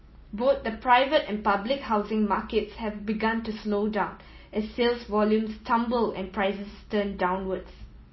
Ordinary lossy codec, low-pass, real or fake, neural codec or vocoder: MP3, 24 kbps; 7.2 kHz; real; none